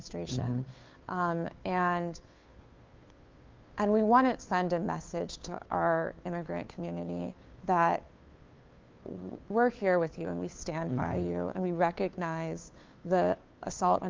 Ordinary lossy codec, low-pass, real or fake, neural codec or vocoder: Opus, 32 kbps; 7.2 kHz; fake; codec, 16 kHz, 2 kbps, FunCodec, trained on Chinese and English, 25 frames a second